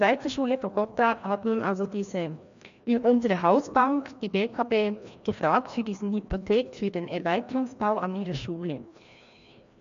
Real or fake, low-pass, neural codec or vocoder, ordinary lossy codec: fake; 7.2 kHz; codec, 16 kHz, 1 kbps, FreqCodec, larger model; MP3, 64 kbps